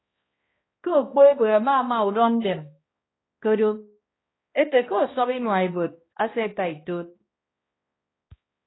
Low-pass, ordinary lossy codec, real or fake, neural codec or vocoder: 7.2 kHz; AAC, 16 kbps; fake; codec, 16 kHz, 1 kbps, X-Codec, HuBERT features, trained on balanced general audio